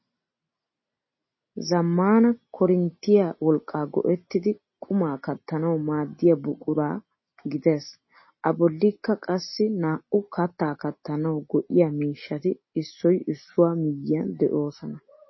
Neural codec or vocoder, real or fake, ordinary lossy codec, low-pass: none; real; MP3, 24 kbps; 7.2 kHz